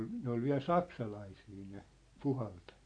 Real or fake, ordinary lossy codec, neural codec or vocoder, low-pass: real; AAC, 48 kbps; none; 9.9 kHz